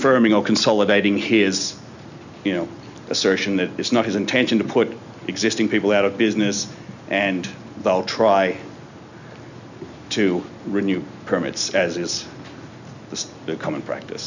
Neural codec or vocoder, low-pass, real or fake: none; 7.2 kHz; real